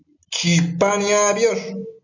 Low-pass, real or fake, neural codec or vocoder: 7.2 kHz; real; none